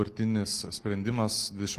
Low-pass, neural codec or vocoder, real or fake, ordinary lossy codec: 14.4 kHz; vocoder, 44.1 kHz, 128 mel bands every 256 samples, BigVGAN v2; fake; Opus, 24 kbps